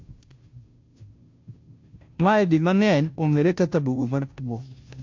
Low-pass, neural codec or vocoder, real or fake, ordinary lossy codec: 7.2 kHz; codec, 16 kHz, 0.5 kbps, FunCodec, trained on Chinese and English, 25 frames a second; fake; MP3, 48 kbps